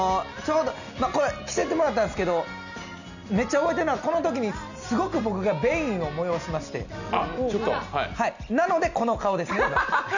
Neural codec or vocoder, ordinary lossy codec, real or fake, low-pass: none; none; real; 7.2 kHz